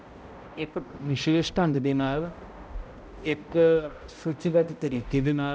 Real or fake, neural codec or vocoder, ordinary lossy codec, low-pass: fake; codec, 16 kHz, 0.5 kbps, X-Codec, HuBERT features, trained on balanced general audio; none; none